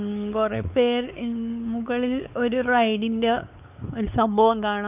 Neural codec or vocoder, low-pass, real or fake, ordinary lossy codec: codec, 16 kHz, 4 kbps, X-Codec, WavLM features, trained on Multilingual LibriSpeech; 3.6 kHz; fake; none